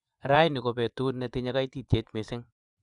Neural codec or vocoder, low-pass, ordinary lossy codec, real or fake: vocoder, 24 kHz, 100 mel bands, Vocos; 10.8 kHz; none; fake